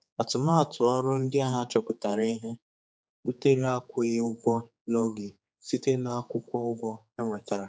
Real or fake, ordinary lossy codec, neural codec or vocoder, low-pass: fake; none; codec, 16 kHz, 4 kbps, X-Codec, HuBERT features, trained on general audio; none